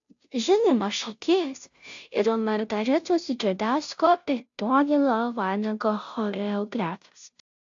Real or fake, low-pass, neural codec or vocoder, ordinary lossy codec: fake; 7.2 kHz; codec, 16 kHz, 0.5 kbps, FunCodec, trained on Chinese and English, 25 frames a second; AAC, 64 kbps